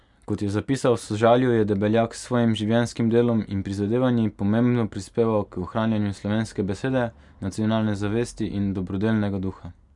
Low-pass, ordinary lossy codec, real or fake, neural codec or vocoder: 10.8 kHz; none; real; none